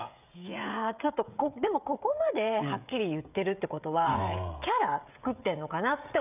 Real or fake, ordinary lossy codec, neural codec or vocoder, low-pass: fake; none; codec, 16 kHz, 16 kbps, FreqCodec, smaller model; 3.6 kHz